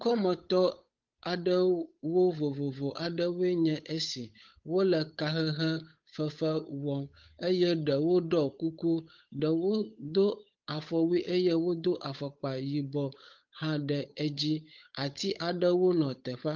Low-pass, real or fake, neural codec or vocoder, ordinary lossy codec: 7.2 kHz; fake; codec, 16 kHz, 8 kbps, FunCodec, trained on Chinese and English, 25 frames a second; Opus, 24 kbps